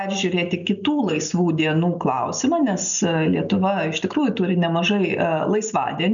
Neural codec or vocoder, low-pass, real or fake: none; 7.2 kHz; real